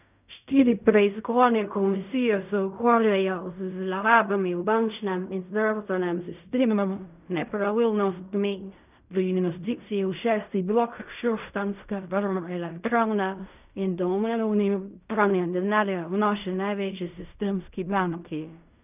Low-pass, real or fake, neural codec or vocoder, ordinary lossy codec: 3.6 kHz; fake; codec, 16 kHz in and 24 kHz out, 0.4 kbps, LongCat-Audio-Codec, fine tuned four codebook decoder; none